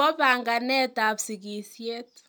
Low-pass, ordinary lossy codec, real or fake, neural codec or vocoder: none; none; real; none